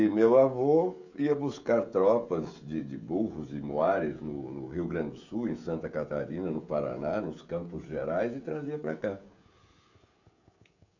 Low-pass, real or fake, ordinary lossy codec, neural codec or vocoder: 7.2 kHz; fake; none; codec, 16 kHz, 16 kbps, FreqCodec, smaller model